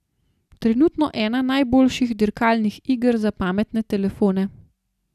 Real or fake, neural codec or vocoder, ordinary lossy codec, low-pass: real; none; none; 14.4 kHz